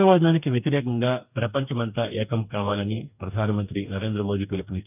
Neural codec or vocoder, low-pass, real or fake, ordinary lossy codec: codec, 44.1 kHz, 2.6 kbps, DAC; 3.6 kHz; fake; none